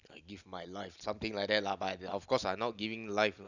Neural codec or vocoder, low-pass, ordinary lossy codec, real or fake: none; 7.2 kHz; none; real